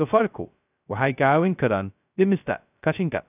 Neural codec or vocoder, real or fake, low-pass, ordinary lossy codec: codec, 16 kHz, 0.2 kbps, FocalCodec; fake; 3.6 kHz; none